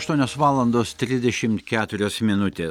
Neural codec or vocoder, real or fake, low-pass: none; real; 19.8 kHz